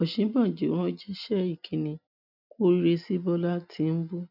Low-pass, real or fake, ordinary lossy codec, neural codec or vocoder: 5.4 kHz; real; none; none